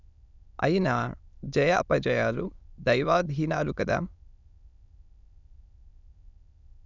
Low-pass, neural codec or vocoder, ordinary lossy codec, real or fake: 7.2 kHz; autoencoder, 22.05 kHz, a latent of 192 numbers a frame, VITS, trained on many speakers; none; fake